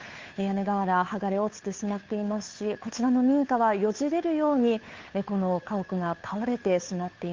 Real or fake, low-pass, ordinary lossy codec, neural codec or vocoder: fake; 7.2 kHz; Opus, 32 kbps; codec, 16 kHz, 2 kbps, FunCodec, trained on Chinese and English, 25 frames a second